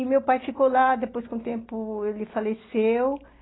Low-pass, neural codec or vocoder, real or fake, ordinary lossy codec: 7.2 kHz; none; real; AAC, 16 kbps